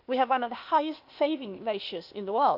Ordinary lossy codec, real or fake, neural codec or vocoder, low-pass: AAC, 48 kbps; fake; codec, 16 kHz, 0.8 kbps, ZipCodec; 5.4 kHz